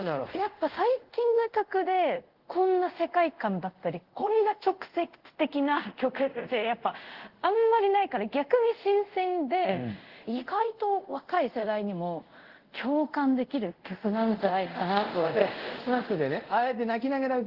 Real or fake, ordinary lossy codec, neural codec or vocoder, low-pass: fake; Opus, 16 kbps; codec, 24 kHz, 0.5 kbps, DualCodec; 5.4 kHz